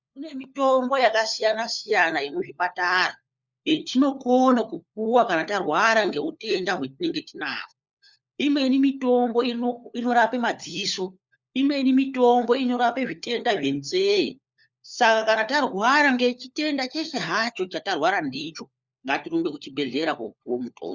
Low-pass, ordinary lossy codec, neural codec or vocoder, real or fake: 7.2 kHz; Opus, 64 kbps; codec, 16 kHz, 4 kbps, FunCodec, trained on LibriTTS, 50 frames a second; fake